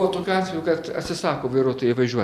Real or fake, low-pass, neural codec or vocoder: real; 14.4 kHz; none